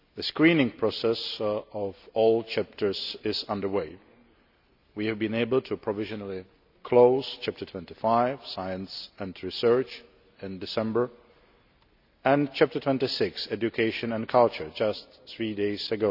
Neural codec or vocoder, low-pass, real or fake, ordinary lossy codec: none; 5.4 kHz; real; none